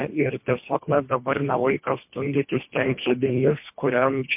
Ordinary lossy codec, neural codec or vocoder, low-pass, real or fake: MP3, 32 kbps; codec, 24 kHz, 1.5 kbps, HILCodec; 3.6 kHz; fake